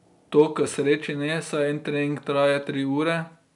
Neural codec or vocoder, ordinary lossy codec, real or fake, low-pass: none; none; real; 10.8 kHz